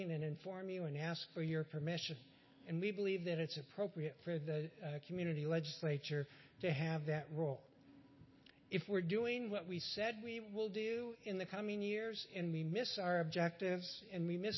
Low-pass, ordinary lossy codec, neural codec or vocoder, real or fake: 7.2 kHz; MP3, 24 kbps; none; real